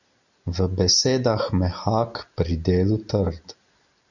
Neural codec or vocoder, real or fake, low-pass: none; real; 7.2 kHz